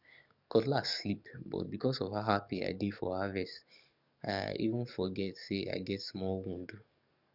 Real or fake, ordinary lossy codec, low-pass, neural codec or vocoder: fake; none; 5.4 kHz; codec, 44.1 kHz, 7.8 kbps, DAC